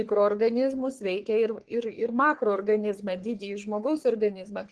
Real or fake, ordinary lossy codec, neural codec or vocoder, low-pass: fake; Opus, 16 kbps; codec, 44.1 kHz, 3.4 kbps, Pupu-Codec; 10.8 kHz